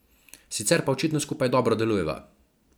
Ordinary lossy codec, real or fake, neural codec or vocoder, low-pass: none; real; none; none